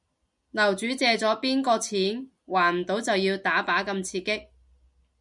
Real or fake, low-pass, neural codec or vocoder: real; 10.8 kHz; none